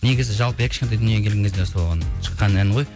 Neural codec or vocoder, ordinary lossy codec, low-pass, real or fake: none; none; none; real